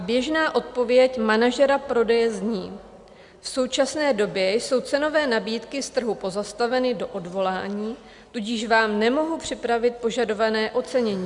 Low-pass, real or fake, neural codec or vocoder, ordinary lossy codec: 10.8 kHz; real; none; Opus, 64 kbps